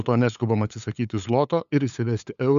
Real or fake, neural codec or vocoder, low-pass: fake; codec, 16 kHz, 8 kbps, FunCodec, trained on LibriTTS, 25 frames a second; 7.2 kHz